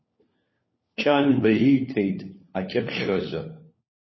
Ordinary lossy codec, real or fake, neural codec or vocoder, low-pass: MP3, 24 kbps; fake; codec, 16 kHz, 4 kbps, FunCodec, trained on LibriTTS, 50 frames a second; 7.2 kHz